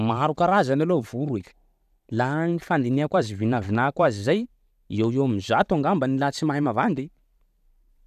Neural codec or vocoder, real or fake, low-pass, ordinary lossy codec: vocoder, 44.1 kHz, 128 mel bands every 512 samples, BigVGAN v2; fake; 14.4 kHz; none